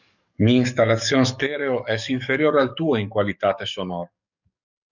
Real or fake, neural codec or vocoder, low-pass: fake; codec, 44.1 kHz, 7.8 kbps, DAC; 7.2 kHz